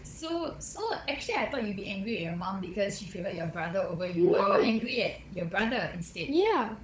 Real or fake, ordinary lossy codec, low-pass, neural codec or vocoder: fake; none; none; codec, 16 kHz, 16 kbps, FunCodec, trained on LibriTTS, 50 frames a second